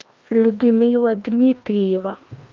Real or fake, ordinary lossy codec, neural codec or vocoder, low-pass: fake; Opus, 24 kbps; codec, 16 kHz, 1 kbps, FreqCodec, larger model; 7.2 kHz